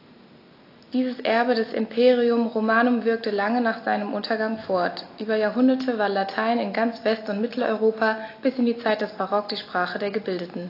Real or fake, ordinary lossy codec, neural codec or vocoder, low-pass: real; AAC, 32 kbps; none; 5.4 kHz